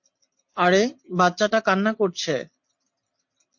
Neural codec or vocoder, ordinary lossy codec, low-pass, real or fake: none; MP3, 48 kbps; 7.2 kHz; real